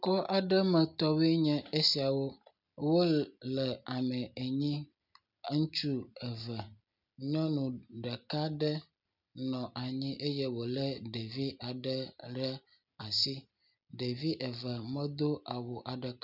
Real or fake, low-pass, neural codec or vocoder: real; 5.4 kHz; none